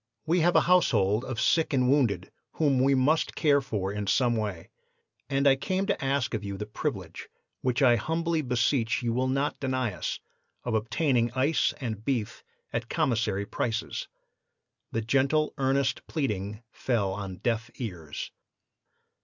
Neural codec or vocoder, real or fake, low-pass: none; real; 7.2 kHz